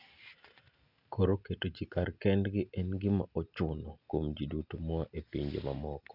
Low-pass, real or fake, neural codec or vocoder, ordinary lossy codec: 5.4 kHz; real; none; none